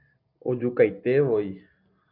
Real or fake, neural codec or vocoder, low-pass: real; none; 5.4 kHz